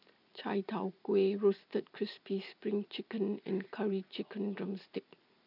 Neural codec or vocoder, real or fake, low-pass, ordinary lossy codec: none; real; 5.4 kHz; none